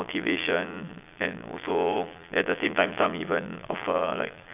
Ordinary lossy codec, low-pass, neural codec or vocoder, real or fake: none; 3.6 kHz; vocoder, 22.05 kHz, 80 mel bands, Vocos; fake